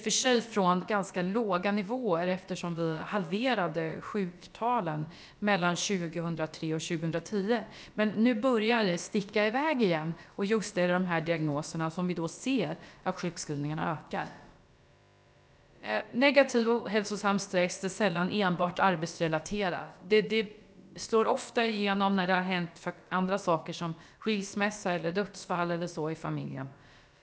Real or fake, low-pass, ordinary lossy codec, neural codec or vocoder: fake; none; none; codec, 16 kHz, about 1 kbps, DyCAST, with the encoder's durations